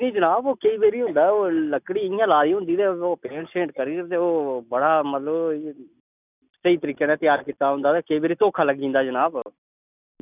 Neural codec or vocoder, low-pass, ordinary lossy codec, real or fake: none; 3.6 kHz; none; real